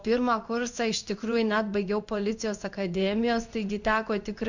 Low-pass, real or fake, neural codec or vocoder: 7.2 kHz; fake; codec, 16 kHz in and 24 kHz out, 1 kbps, XY-Tokenizer